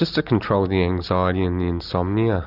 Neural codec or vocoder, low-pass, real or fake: none; 5.4 kHz; real